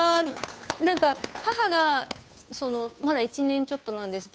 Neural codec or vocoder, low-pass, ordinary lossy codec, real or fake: codec, 16 kHz, 2 kbps, FunCodec, trained on Chinese and English, 25 frames a second; none; none; fake